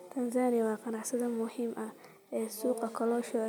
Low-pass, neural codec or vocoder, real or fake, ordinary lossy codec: none; none; real; none